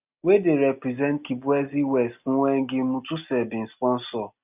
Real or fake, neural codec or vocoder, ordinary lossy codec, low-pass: real; none; none; 3.6 kHz